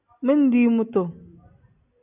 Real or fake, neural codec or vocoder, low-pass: real; none; 3.6 kHz